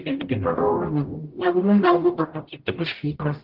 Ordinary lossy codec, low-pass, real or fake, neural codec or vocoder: Opus, 16 kbps; 5.4 kHz; fake; codec, 44.1 kHz, 0.9 kbps, DAC